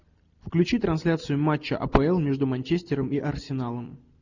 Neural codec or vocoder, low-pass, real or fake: none; 7.2 kHz; real